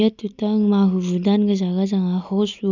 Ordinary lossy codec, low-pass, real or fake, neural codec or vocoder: none; 7.2 kHz; real; none